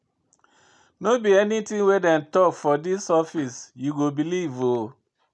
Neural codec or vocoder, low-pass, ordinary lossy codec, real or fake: none; none; none; real